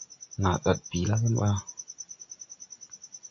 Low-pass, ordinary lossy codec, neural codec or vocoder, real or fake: 7.2 kHz; AAC, 48 kbps; none; real